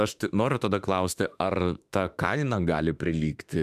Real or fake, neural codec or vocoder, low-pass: fake; autoencoder, 48 kHz, 32 numbers a frame, DAC-VAE, trained on Japanese speech; 14.4 kHz